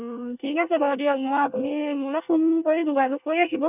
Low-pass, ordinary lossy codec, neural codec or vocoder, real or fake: 3.6 kHz; none; codec, 24 kHz, 1 kbps, SNAC; fake